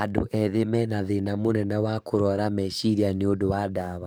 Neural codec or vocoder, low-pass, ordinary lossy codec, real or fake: codec, 44.1 kHz, 7.8 kbps, DAC; none; none; fake